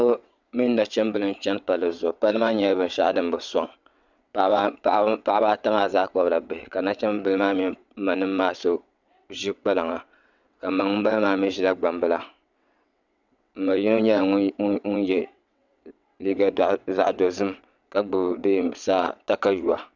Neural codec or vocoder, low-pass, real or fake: vocoder, 22.05 kHz, 80 mel bands, WaveNeXt; 7.2 kHz; fake